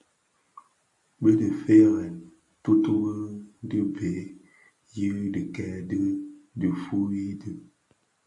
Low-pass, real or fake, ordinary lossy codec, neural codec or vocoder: 10.8 kHz; real; MP3, 48 kbps; none